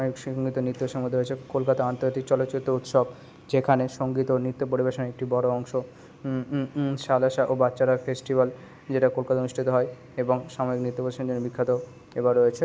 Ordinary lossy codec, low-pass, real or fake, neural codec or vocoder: none; none; real; none